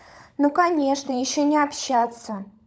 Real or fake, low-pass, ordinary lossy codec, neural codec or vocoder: fake; none; none; codec, 16 kHz, 16 kbps, FunCodec, trained on LibriTTS, 50 frames a second